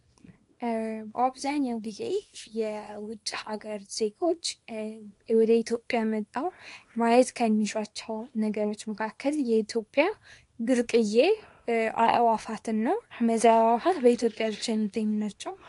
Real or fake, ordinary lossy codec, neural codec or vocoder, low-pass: fake; AAC, 48 kbps; codec, 24 kHz, 0.9 kbps, WavTokenizer, small release; 10.8 kHz